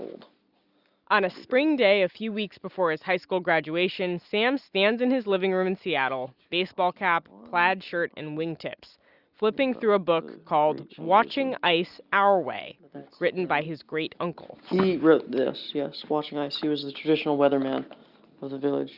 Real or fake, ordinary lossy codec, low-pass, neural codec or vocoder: real; Opus, 64 kbps; 5.4 kHz; none